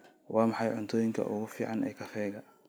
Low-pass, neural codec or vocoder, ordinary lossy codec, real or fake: none; none; none; real